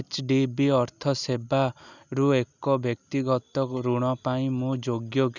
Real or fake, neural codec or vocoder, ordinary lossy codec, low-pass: real; none; none; 7.2 kHz